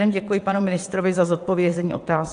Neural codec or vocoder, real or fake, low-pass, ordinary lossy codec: vocoder, 22.05 kHz, 80 mel bands, WaveNeXt; fake; 9.9 kHz; AAC, 64 kbps